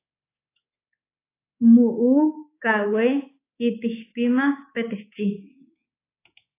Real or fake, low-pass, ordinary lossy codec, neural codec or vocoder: fake; 3.6 kHz; AAC, 24 kbps; codec, 24 kHz, 3.1 kbps, DualCodec